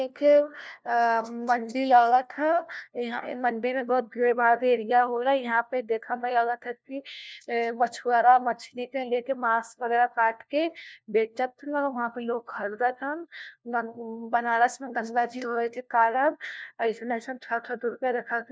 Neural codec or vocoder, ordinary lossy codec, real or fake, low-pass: codec, 16 kHz, 1 kbps, FunCodec, trained on LibriTTS, 50 frames a second; none; fake; none